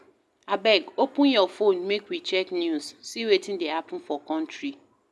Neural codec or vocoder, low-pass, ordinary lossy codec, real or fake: none; none; none; real